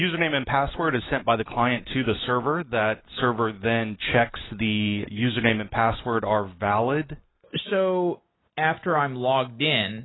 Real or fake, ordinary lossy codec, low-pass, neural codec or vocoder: real; AAC, 16 kbps; 7.2 kHz; none